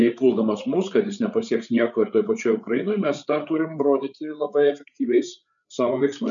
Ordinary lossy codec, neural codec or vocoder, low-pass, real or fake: MP3, 96 kbps; codec, 16 kHz, 8 kbps, FreqCodec, larger model; 7.2 kHz; fake